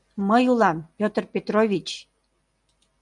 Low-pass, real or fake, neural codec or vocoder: 10.8 kHz; real; none